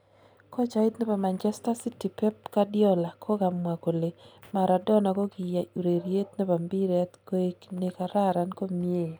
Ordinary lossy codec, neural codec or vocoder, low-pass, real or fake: none; none; none; real